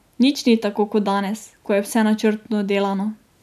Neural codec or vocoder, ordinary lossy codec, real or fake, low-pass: none; none; real; 14.4 kHz